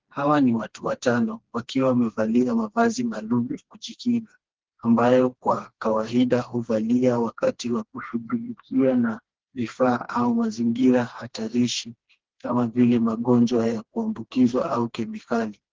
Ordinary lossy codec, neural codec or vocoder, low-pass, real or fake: Opus, 16 kbps; codec, 16 kHz, 2 kbps, FreqCodec, smaller model; 7.2 kHz; fake